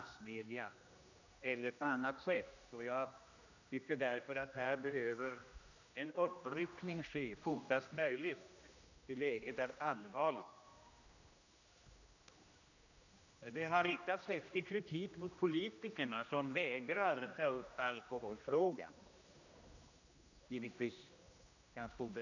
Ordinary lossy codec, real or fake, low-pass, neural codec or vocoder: none; fake; 7.2 kHz; codec, 16 kHz, 1 kbps, X-Codec, HuBERT features, trained on general audio